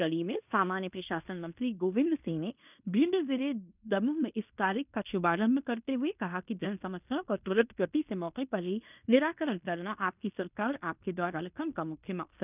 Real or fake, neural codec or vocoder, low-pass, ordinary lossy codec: fake; codec, 16 kHz in and 24 kHz out, 0.9 kbps, LongCat-Audio-Codec, fine tuned four codebook decoder; 3.6 kHz; none